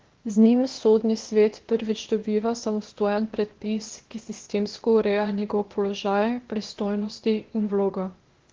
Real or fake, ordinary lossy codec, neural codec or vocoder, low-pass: fake; Opus, 16 kbps; codec, 16 kHz, 0.8 kbps, ZipCodec; 7.2 kHz